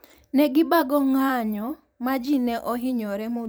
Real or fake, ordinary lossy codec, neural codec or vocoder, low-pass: fake; none; vocoder, 44.1 kHz, 128 mel bands every 512 samples, BigVGAN v2; none